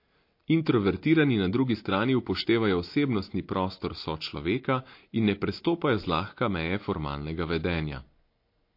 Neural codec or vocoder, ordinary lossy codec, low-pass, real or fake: none; MP3, 32 kbps; 5.4 kHz; real